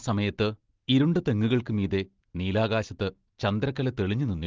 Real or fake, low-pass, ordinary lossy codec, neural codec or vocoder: real; 7.2 kHz; Opus, 16 kbps; none